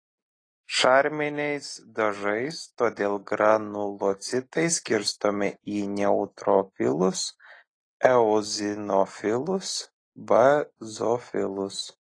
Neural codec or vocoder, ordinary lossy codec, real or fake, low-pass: none; AAC, 32 kbps; real; 9.9 kHz